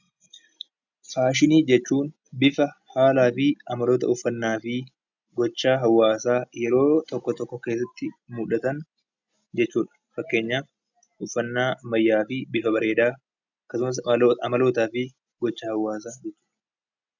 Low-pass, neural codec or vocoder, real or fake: 7.2 kHz; none; real